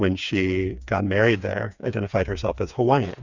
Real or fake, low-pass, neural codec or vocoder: fake; 7.2 kHz; codec, 16 kHz, 4 kbps, FreqCodec, smaller model